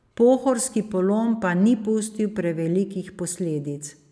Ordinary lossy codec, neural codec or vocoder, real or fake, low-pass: none; none; real; none